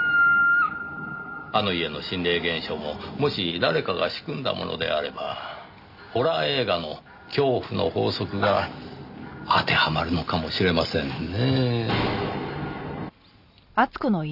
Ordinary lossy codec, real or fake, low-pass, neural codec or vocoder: none; real; 5.4 kHz; none